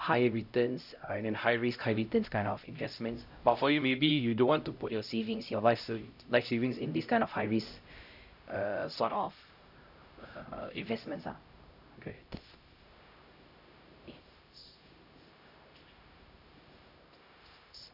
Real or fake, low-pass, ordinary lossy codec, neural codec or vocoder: fake; 5.4 kHz; none; codec, 16 kHz, 0.5 kbps, X-Codec, HuBERT features, trained on LibriSpeech